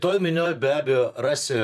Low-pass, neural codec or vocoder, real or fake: 14.4 kHz; vocoder, 44.1 kHz, 128 mel bands, Pupu-Vocoder; fake